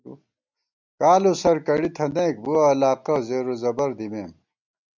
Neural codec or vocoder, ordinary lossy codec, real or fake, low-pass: none; MP3, 64 kbps; real; 7.2 kHz